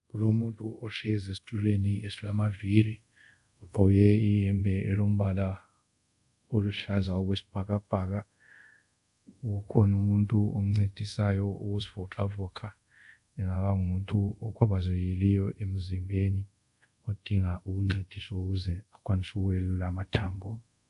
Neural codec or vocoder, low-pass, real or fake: codec, 24 kHz, 0.5 kbps, DualCodec; 10.8 kHz; fake